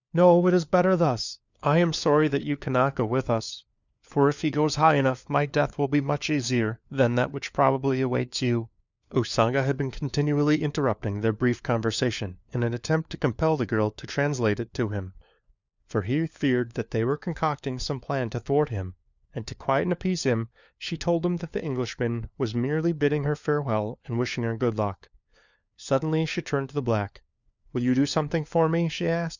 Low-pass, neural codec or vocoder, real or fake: 7.2 kHz; codec, 16 kHz, 4 kbps, FunCodec, trained on LibriTTS, 50 frames a second; fake